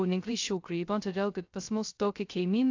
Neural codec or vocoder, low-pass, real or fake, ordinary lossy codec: codec, 16 kHz, 0.2 kbps, FocalCodec; 7.2 kHz; fake; AAC, 48 kbps